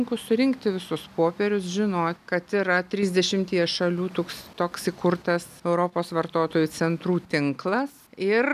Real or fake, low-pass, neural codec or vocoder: real; 14.4 kHz; none